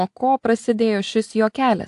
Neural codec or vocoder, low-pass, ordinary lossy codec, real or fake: none; 10.8 kHz; AAC, 64 kbps; real